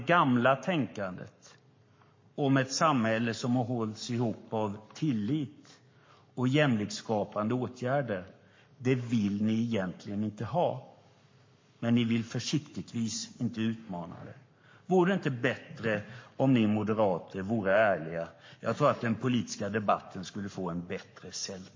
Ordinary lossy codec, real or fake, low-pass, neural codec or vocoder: MP3, 32 kbps; fake; 7.2 kHz; codec, 44.1 kHz, 7.8 kbps, Pupu-Codec